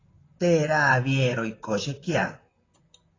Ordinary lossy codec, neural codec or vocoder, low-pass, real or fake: AAC, 32 kbps; vocoder, 44.1 kHz, 128 mel bands, Pupu-Vocoder; 7.2 kHz; fake